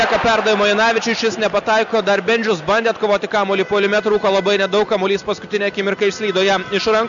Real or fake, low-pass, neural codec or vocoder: real; 7.2 kHz; none